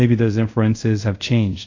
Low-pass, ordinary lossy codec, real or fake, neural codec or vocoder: 7.2 kHz; AAC, 48 kbps; fake; codec, 24 kHz, 0.5 kbps, DualCodec